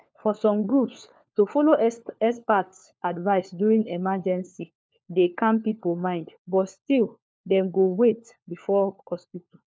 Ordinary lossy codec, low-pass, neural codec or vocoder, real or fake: none; none; codec, 16 kHz, 4 kbps, FunCodec, trained on LibriTTS, 50 frames a second; fake